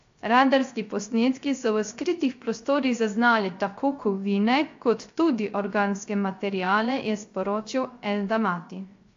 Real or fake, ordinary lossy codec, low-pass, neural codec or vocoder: fake; AAC, 48 kbps; 7.2 kHz; codec, 16 kHz, 0.3 kbps, FocalCodec